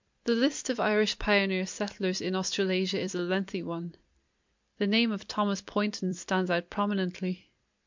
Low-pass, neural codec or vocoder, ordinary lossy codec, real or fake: 7.2 kHz; vocoder, 44.1 kHz, 128 mel bands every 256 samples, BigVGAN v2; MP3, 64 kbps; fake